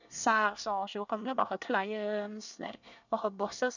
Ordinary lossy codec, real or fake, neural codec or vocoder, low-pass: none; fake; codec, 24 kHz, 1 kbps, SNAC; 7.2 kHz